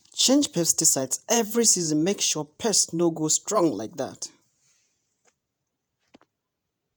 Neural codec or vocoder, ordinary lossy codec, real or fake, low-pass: vocoder, 48 kHz, 128 mel bands, Vocos; none; fake; none